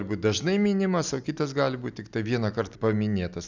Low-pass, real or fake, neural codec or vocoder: 7.2 kHz; real; none